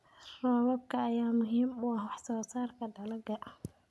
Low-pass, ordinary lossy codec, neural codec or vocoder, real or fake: none; none; none; real